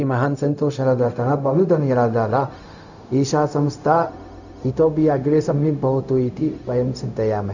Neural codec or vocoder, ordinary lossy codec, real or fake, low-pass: codec, 16 kHz, 0.4 kbps, LongCat-Audio-Codec; none; fake; 7.2 kHz